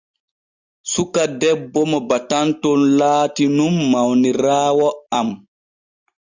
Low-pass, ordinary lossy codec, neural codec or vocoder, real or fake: 7.2 kHz; Opus, 64 kbps; none; real